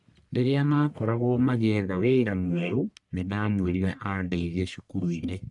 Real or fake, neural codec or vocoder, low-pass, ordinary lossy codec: fake; codec, 44.1 kHz, 1.7 kbps, Pupu-Codec; 10.8 kHz; none